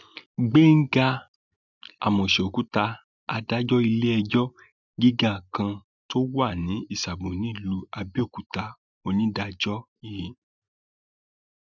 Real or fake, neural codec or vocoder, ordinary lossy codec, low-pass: real; none; none; 7.2 kHz